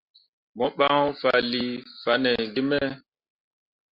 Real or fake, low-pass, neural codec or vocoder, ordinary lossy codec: real; 5.4 kHz; none; Opus, 64 kbps